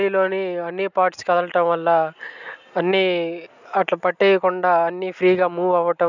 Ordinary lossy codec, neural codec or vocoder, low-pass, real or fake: none; none; 7.2 kHz; real